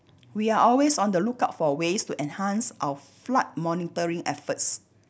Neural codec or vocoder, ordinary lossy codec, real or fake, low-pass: none; none; real; none